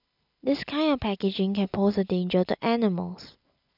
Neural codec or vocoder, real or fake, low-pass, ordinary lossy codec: none; real; 5.4 kHz; none